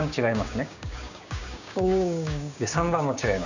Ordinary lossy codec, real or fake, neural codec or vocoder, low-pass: none; fake; codec, 16 kHz, 6 kbps, DAC; 7.2 kHz